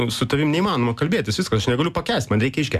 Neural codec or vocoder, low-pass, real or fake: none; 14.4 kHz; real